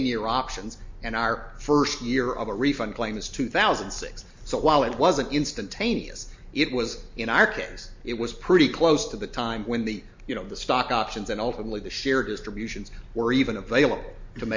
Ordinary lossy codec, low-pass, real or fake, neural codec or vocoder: MP3, 48 kbps; 7.2 kHz; real; none